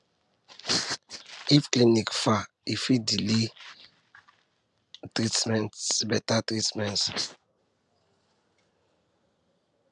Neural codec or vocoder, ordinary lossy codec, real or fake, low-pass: none; none; real; 10.8 kHz